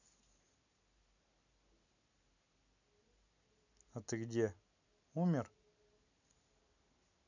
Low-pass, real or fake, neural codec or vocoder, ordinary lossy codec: 7.2 kHz; real; none; none